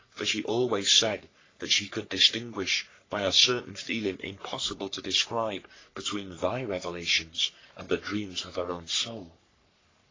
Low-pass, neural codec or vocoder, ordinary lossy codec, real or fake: 7.2 kHz; codec, 44.1 kHz, 3.4 kbps, Pupu-Codec; AAC, 32 kbps; fake